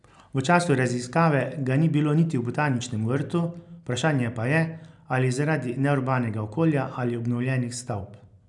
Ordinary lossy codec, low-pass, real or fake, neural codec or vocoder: none; 10.8 kHz; real; none